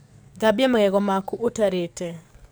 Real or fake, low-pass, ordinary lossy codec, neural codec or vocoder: fake; none; none; codec, 44.1 kHz, 7.8 kbps, DAC